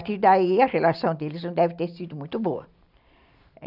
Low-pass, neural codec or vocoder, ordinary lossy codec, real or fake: 5.4 kHz; none; none; real